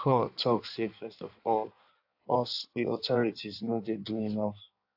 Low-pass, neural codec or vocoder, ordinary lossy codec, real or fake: 5.4 kHz; codec, 16 kHz in and 24 kHz out, 1.1 kbps, FireRedTTS-2 codec; AAC, 48 kbps; fake